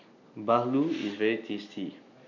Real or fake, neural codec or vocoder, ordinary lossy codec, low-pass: real; none; none; 7.2 kHz